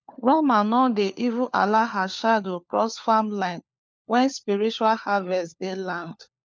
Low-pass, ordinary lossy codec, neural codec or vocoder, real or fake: none; none; codec, 16 kHz, 4 kbps, FunCodec, trained on LibriTTS, 50 frames a second; fake